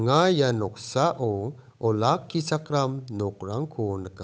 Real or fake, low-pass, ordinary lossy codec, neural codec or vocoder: fake; none; none; codec, 16 kHz, 8 kbps, FunCodec, trained on Chinese and English, 25 frames a second